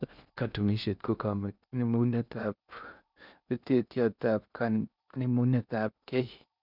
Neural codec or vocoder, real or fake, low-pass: codec, 16 kHz in and 24 kHz out, 0.6 kbps, FocalCodec, streaming, 4096 codes; fake; 5.4 kHz